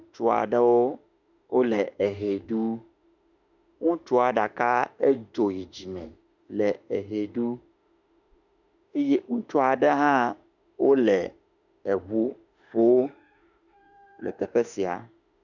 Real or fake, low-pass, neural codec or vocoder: fake; 7.2 kHz; autoencoder, 48 kHz, 32 numbers a frame, DAC-VAE, trained on Japanese speech